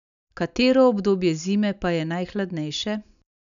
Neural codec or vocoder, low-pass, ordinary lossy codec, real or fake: none; 7.2 kHz; none; real